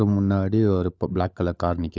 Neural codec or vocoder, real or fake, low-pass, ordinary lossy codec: codec, 16 kHz, 16 kbps, FunCodec, trained on LibriTTS, 50 frames a second; fake; none; none